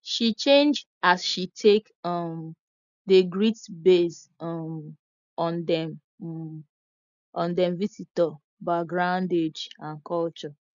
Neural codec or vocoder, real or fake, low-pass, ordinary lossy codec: none; real; 7.2 kHz; none